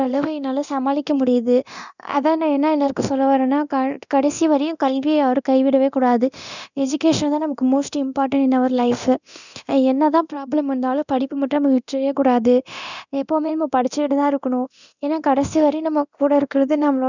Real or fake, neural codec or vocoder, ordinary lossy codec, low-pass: fake; codec, 24 kHz, 0.9 kbps, DualCodec; none; 7.2 kHz